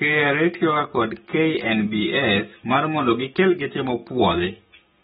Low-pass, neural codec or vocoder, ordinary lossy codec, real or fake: 19.8 kHz; codec, 44.1 kHz, 7.8 kbps, DAC; AAC, 16 kbps; fake